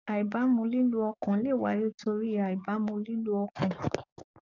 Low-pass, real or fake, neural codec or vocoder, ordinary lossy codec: 7.2 kHz; fake; codec, 44.1 kHz, 7.8 kbps, DAC; none